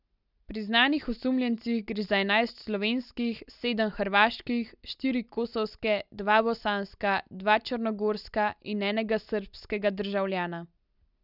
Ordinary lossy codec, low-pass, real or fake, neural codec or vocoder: none; 5.4 kHz; real; none